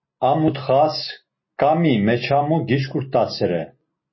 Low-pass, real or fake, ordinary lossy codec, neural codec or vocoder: 7.2 kHz; real; MP3, 24 kbps; none